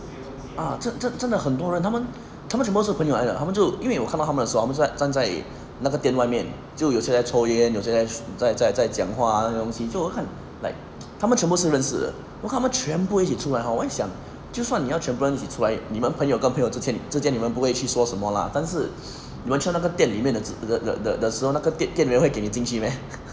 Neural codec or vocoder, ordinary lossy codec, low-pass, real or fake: none; none; none; real